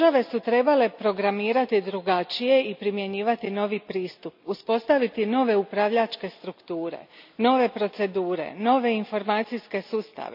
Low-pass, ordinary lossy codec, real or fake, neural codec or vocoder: 5.4 kHz; none; real; none